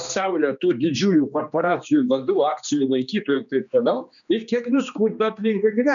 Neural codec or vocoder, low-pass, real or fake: codec, 16 kHz, 2 kbps, X-Codec, HuBERT features, trained on general audio; 7.2 kHz; fake